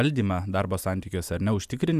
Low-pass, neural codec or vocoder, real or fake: 14.4 kHz; none; real